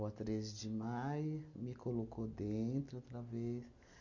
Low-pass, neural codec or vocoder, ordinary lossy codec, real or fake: 7.2 kHz; none; none; real